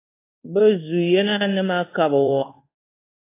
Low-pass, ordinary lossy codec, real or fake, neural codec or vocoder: 3.6 kHz; AAC, 24 kbps; fake; codec, 24 kHz, 1.2 kbps, DualCodec